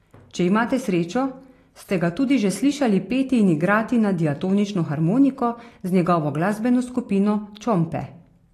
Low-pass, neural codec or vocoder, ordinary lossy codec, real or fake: 14.4 kHz; none; AAC, 48 kbps; real